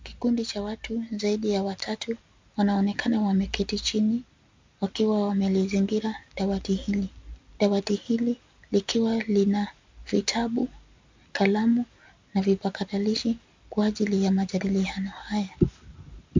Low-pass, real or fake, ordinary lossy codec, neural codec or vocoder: 7.2 kHz; real; MP3, 64 kbps; none